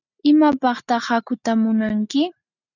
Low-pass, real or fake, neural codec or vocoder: 7.2 kHz; real; none